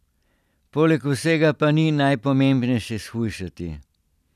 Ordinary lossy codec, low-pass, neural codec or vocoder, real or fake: none; 14.4 kHz; vocoder, 44.1 kHz, 128 mel bands every 256 samples, BigVGAN v2; fake